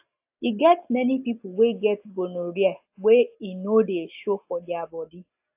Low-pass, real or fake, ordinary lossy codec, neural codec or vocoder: 3.6 kHz; real; AAC, 32 kbps; none